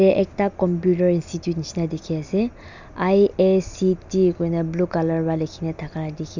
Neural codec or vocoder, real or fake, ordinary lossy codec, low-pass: none; real; none; 7.2 kHz